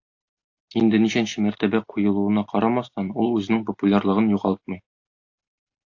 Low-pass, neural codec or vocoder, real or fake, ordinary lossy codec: 7.2 kHz; none; real; AAC, 48 kbps